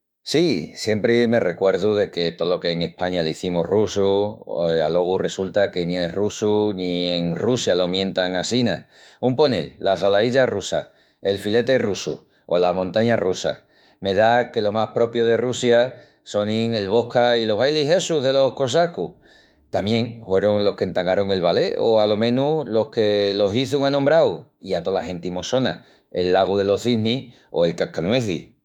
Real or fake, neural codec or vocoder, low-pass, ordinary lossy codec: fake; autoencoder, 48 kHz, 32 numbers a frame, DAC-VAE, trained on Japanese speech; 19.8 kHz; none